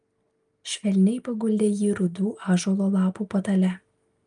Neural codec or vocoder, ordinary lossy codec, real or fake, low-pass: none; Opus, 32 kbps; real; 9.9 kHz